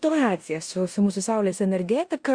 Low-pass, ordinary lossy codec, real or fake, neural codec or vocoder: 9.9 kHz; Opus, 64 kbps; fake; codec, 16 kHz in and 24 kHz out, 0.9 kbps, LongCat-Audio-Codec, four codebook decoder